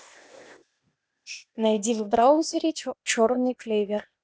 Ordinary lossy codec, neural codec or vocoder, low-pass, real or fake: none; codec, 16 kHz, 0.8 kbps, ZipCodec; none; fake